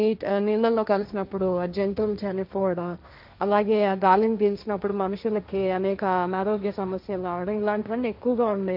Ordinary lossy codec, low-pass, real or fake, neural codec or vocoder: none; 5.4 kHz; fake; codec, 16 kHz, 1.1 kbps, Voila-Tokenizer